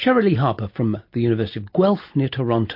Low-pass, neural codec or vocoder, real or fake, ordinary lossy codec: 5.4 kHz; none; real; MP3, 32 kbps